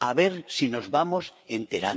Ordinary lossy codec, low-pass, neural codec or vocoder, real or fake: none; none; codec, 16 kHz, 4 kbps, FreqCodec, larger model; fake